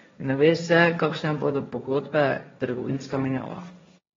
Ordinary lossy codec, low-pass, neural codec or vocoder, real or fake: AAC, 24 kbps; 7.2 kHz; codec, 16 kHz, 1.1 kbps, Voila-Tokenizer; fake